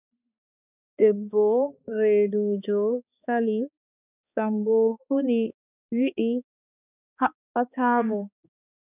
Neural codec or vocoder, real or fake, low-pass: codec, 16 kHz, 2 kbps, X-Codec, HuBERT features, trained on balanced general audio; fake; 3.6 kHz